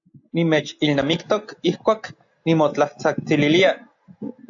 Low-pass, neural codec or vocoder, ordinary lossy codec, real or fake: 7.2 kHz; none; AAC, 64 kbps; real